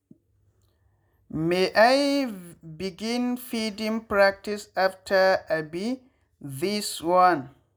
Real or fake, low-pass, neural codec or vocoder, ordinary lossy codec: real; none; none; none